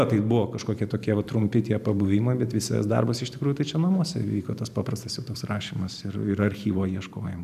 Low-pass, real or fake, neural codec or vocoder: 14.4 kHz; fake; vocoder, 44.1 kHz, 128 mel bands every 512 samples, BigVGAN v2